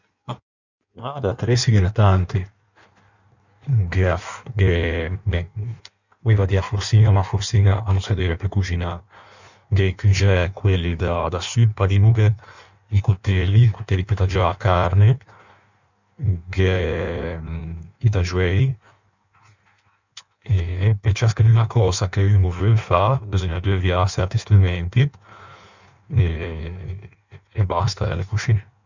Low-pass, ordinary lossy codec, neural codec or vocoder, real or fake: 7.2 kHz; none; codec, 16 kHz in and 24 kHz out, 1.1 kbps, FireRedTTS-2 codec; fake